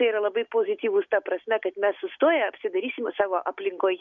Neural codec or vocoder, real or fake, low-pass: none; real; 7.2 kHz